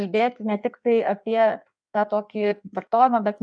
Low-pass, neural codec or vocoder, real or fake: 9.9 kHz; codec, 16 kHz in and 24 kHz out, 1.1 kbps, FireRedTTS-2 codec; fake